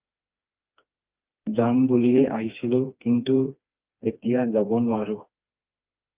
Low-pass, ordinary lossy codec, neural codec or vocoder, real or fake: 3.6 kHz; Opus, 24 kbps; codec, 16 kHz, 2 kbps, FreqCodec, smaller model; fake